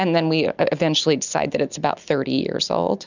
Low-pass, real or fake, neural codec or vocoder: 7.2 kHz; real; none